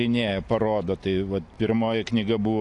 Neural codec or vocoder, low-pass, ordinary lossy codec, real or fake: none; 10.8 kHz; AAC, 64 kbps; real